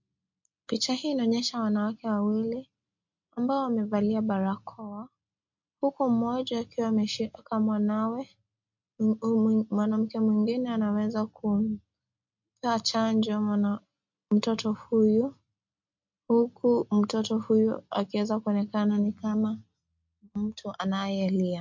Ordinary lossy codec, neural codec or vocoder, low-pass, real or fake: MP3, 48 kbps; none; 7.2 kHz; real